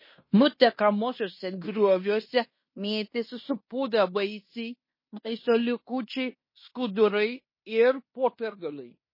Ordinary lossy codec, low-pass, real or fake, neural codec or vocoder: MP3, 24 kbps; 5.4 kHz; fake; codec, 16 kHz in and 24 kHz out, 0.9 kbps, LongCat-Audio-Codec, fine tuned four codebook decoder